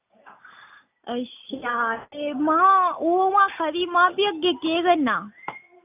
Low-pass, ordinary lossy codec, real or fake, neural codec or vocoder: 3.6 kHz; AAC, 32 kbps; real; none